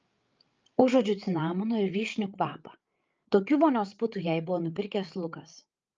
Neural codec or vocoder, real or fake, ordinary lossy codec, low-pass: codec, 16 kHz, 8 kbps, FreqCodec, larger model; fake; Opus, 24 kbps; 7.2 kHz